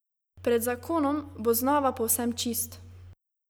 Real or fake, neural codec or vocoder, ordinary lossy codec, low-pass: real; none; none; none